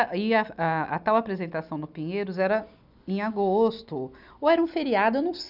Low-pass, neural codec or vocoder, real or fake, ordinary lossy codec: 5.4 kHz; none; real; none